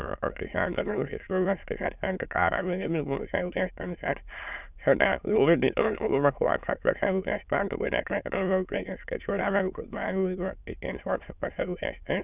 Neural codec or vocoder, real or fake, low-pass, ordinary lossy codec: autoencoder, 22.05 kHz, a latent of 192 numbers a frame, VITS, trained on many speakers; fake; 3.6 kHz; none